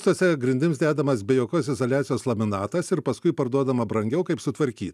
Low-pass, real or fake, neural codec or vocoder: 14.4 kHz; real; none